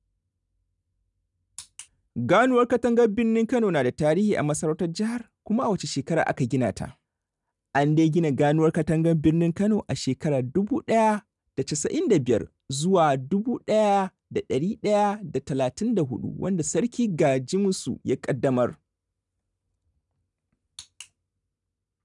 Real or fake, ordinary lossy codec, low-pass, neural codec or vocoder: real; none; 10.8 kHz; none